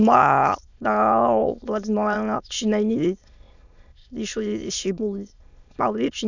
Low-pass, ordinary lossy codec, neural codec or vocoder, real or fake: 7.2 kHz; none; autoencoder, 22.05 kHz, a latent of 192 numbers a frame, VITS, trained on many speakers; fake